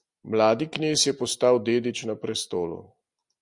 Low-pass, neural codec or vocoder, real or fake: 10.8 kHz; none; real